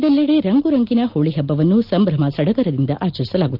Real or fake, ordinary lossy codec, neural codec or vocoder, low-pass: real; Opus, 32 kbps; none; 5.4 kHz